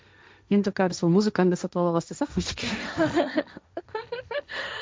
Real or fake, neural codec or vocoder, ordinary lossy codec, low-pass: fake; codec, 16 kHz, 1.1 kbps, Voila-Tokenizer; none; none